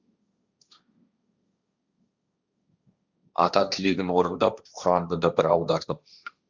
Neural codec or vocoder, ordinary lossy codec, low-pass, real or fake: codec, 16 kHz, 1.1 kbps, Voila-Tokenizer; Opus, 64 kbps; 7.2 kHz; fake